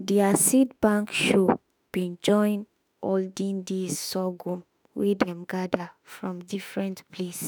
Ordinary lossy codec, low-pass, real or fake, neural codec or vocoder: none; none; fake; autoencoder, 48 kHz, 32 numbers a frame, DAC-VAE, trained on Japanese speech